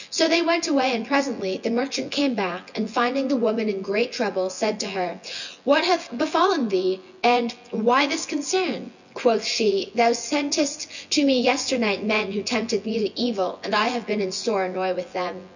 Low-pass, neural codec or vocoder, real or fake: 7.2 kHz; vocoder, 24 kHz, 100 mel bands, Vocos; fake